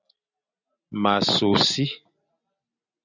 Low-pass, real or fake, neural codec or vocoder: 7.2 kHz; real; none